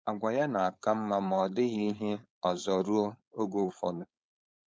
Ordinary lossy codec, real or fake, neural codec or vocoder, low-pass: none; fake; codec, 16 kHz, 4.8 kbps, FACodec; none